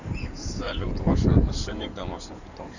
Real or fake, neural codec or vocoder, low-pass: fake; codec, 16 kHz in and 24 kHz out, 2.2 kbps, FireRedTTS-2 codec; 7.2 kHz